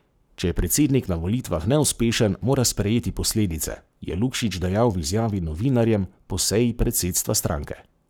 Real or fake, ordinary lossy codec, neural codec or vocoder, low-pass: fake; none; codec, 44.1 kHz, 7.8 kbps, Pupu-Codec; none